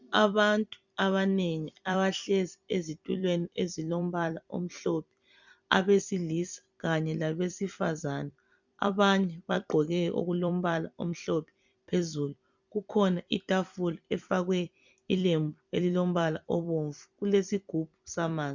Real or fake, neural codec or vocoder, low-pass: fake; vocoder, 44.1 kHz, 128 mel bands every 256 samples, BigVGAN v2; 7.2 kHz